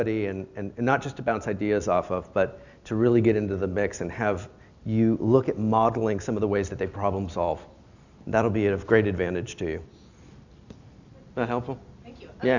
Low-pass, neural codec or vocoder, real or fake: 7.2 kHz; none; real